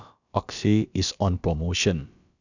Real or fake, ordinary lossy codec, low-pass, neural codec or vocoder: fake; none; 7.2 kHz; codec, 16 kHz, about 1 kbps, DyCAST, with the encoder's durations